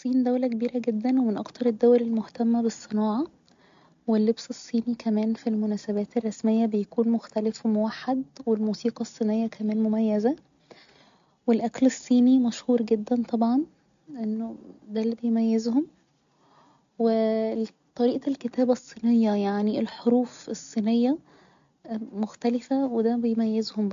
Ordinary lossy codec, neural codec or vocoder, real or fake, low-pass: AAC, 64 kbps; none; real; 7.2 kHz